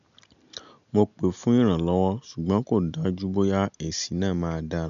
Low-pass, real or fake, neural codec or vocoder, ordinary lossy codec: 7.2 kHz; real; none; MP3, 96 kbps